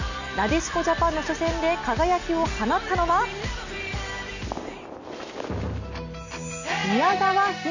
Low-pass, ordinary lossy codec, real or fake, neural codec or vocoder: 7.2 kHz; none; real; none